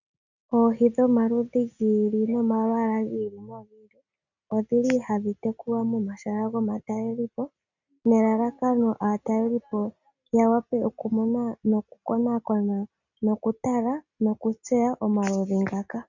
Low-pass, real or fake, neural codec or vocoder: 7.2 kHz; real; none